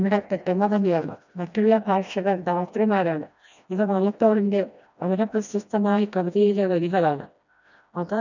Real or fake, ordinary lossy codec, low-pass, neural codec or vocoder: fake; none; 7.2 kHz; codec, 16 kHz, 1 kbps, FreqCodec, smaller model